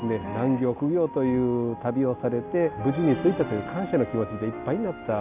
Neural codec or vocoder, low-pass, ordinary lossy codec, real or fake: none; 3.6 kHz; none; real